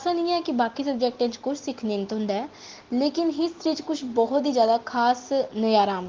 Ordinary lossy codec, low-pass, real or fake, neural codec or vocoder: Opus, 16 kbps; 7.2 kHz; real; none